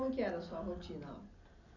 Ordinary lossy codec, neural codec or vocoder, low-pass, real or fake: none; none; 7.2 kHz; real